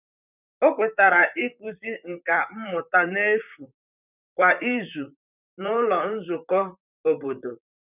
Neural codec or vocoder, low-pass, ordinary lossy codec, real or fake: vocoder, 44.1 kHz, 128 mel bands, Pupu-Vocoder; 3.6 kHz; none; fake